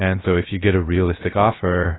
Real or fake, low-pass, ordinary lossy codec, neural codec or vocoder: fake; 7.2 kHz; AAC, 16 kbps; codec, 16 kHz, 0.7 kbps, FocalCodec